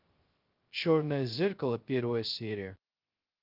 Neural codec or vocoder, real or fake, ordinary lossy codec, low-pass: codec, 16 kHz, 0.2 kbps, FocalCodec; fake; Opus, 32 kbps; 5.4 kHz